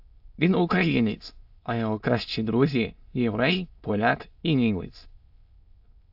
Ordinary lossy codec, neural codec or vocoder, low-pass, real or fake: AAC, 48 kbps; autoencoder, 22.05 kHz, a latent of 192 numbers a frame, VITS, trained on many speakers; 5.4 kHz; fake